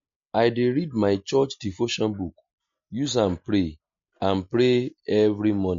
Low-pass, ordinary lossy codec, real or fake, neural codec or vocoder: 7.2 kHz; MP3, 48 kbps; real; none